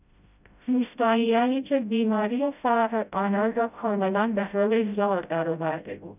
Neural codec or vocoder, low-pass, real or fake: codec, 16 kHz, 0.5 kbps, FreqCodec, smaller model; 3.6 kHz; fake